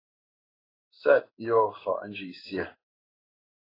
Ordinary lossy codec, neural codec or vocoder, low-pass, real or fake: AAC, 24 kbps; codec, 16 kHz in and 24 kHz out, 1 kbps, XY-Tokenizer; 5.4 kHz; fake